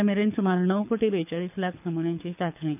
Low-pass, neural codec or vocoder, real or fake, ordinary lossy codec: 3.6 kHz; codec, 24 kHz, 6 kbps, HILCodec; fake; none